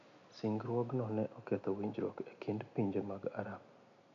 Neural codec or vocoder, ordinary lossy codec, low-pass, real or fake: none; none; 7.2 kHz; real